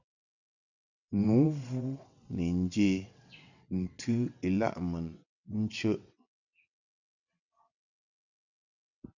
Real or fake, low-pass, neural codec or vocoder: fake; 7.2 kHz; vocoder, 22.05 kHz, 80 mel bands, WaveNeXt